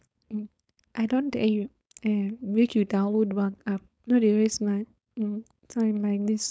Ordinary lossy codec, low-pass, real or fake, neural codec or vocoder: none; none; fake; codec, 16 kHz, 4.8 kbps, FACodec